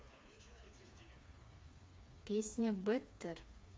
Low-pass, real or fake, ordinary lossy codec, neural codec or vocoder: none; fake; none; codec, 16 kHz, 4 kbps, FreqCodec, smaller model